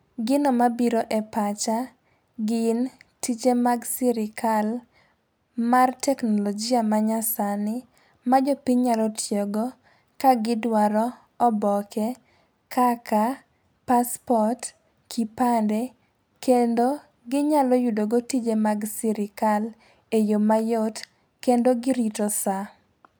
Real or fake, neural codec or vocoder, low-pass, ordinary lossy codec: real; none; none; none